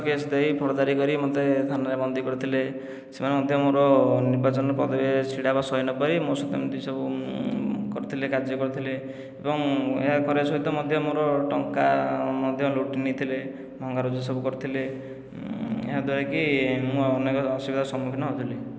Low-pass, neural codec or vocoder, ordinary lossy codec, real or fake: none; none; none; real